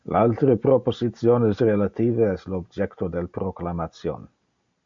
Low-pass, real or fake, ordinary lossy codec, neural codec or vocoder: 7.2 kHz; real; MP3, 48 kbps; none